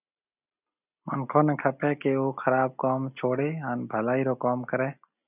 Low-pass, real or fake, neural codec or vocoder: 3.6 kHz; real; none